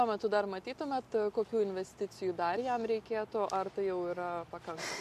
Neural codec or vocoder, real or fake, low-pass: none; real; 14.4 kHz